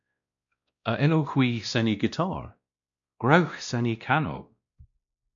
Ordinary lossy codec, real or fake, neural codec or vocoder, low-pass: MP3, 64 kbps; fake; codec, 16 kHz, 1 kbps, X-Codec, WavLM features, trained on Multilingual LibriSpeech; 7.2 kHz